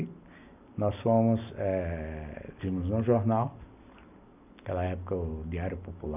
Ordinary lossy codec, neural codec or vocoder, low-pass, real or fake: none; none; 3.6 kHz; real